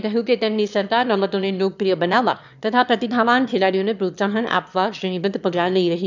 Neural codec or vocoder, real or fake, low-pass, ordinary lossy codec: autoencoder, 22.05 kHz, a latent of 192 numbers a frame, VITS, trained on one speaker; fake; 7.2 kHz; none